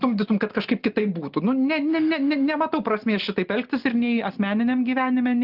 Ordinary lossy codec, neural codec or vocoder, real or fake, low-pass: Opus, 32 kbps; none; real; 5.4 kHz